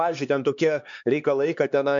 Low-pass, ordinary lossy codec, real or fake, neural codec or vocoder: 7.2 kHz; MP3, 64 kbps; fake; codec, 16 kHz, 2 kbps, X-Codec, HuBERT features, trained on LibriSpeech